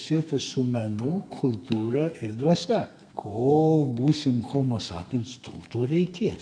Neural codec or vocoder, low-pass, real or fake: codec, 32 kHz, 1.9 kbps, SNAC; 9.9 kHz; fake